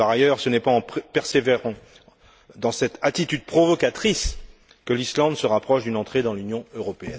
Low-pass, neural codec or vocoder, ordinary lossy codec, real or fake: none; none; none; real